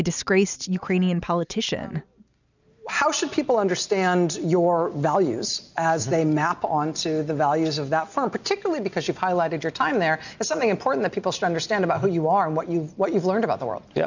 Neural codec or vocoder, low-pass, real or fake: none; 7.2 kHz; real